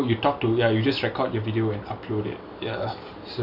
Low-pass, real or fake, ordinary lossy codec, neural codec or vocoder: 5.4 kHz; real; none; none